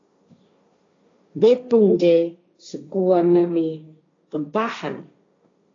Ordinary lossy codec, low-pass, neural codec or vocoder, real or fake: AAC, 32 kbps; 7.2 kHz; codec, 16 kHz, 1.1 kbps, Voila-Tokenizer; fake